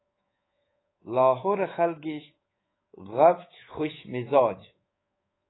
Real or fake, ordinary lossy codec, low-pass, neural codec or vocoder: fake; AAC, 16 kbps; 7.2 kHz; codec, 24 kHz, 3.1 kbps, DualCodec